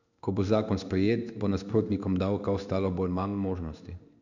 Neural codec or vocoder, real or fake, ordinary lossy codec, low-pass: codec, 16 kHz in and 24 kHz out, 1 kbps, XY-Tokenizer; fake; none; 7.2 kHz